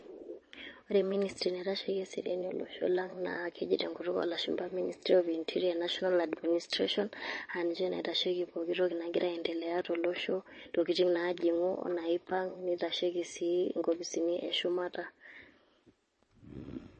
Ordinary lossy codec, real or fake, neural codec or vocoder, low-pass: MP3, 32 kbps; fake; vocoder, 22.05 kHz, 80 mel bands, Vocos; 9.9 kHz